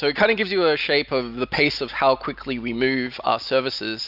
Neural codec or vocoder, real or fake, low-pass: none; real; 5.4 kHz